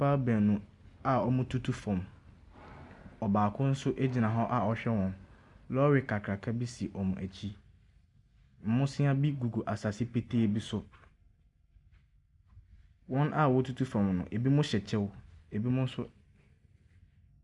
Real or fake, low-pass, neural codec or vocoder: real; 10.8 kHz; none